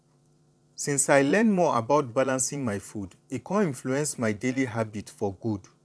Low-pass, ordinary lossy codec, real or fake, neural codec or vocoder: none; none; fake; vocoder, 22.05 kHz, 80 mel bands, Vocos